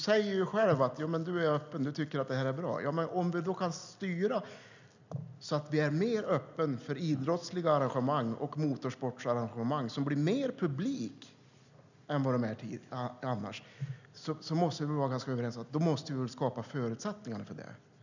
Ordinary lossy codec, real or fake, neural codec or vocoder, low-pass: none; real; none; 7.2 kHz